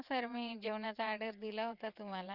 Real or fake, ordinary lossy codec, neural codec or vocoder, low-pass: fake; Opus, 64 kbps; vocoder, 22.05 kHz, 80 mel bands, WaveNeXt; 5.4 kHz